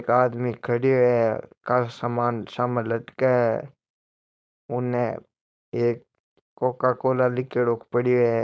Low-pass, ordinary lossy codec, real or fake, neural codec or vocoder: none; none; fake; codec, 16 kHz, 4.8 kbps, FACodec